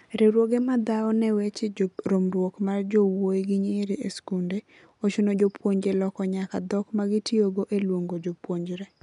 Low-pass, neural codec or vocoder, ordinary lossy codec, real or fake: 10.8 kHz; none; none; real